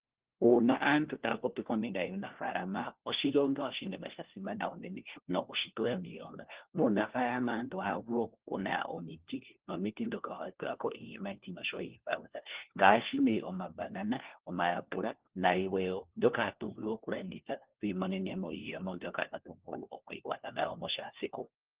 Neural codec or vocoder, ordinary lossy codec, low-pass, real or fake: codec, 16 kHz, 1 kbps, FunCodec, trained on LibriTTS, 50 frames a second; Opus, 16 kbps; 3.6 kHz; fake